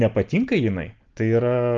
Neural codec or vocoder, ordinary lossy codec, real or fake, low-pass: none; Opus, 32 kbps; real; 7.2 kHz